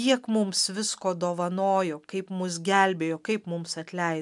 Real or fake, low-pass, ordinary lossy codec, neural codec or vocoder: real; 10.8 kHz; MP3, 96 kbps; none